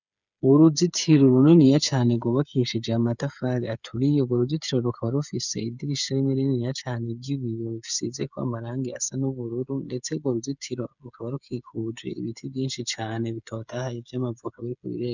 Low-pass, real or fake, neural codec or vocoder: 7.2 kHz; fake; codec, 16 kHz, 8 kbps, FreqCodec, smaller model